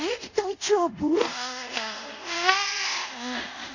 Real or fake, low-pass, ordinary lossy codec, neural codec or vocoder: fake; 7.2 kHz; none; codec, 24 kHz, 0.5 kbps, DualCodec